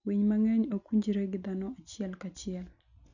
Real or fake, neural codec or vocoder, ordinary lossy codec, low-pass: real; none; none; 7.2 kHz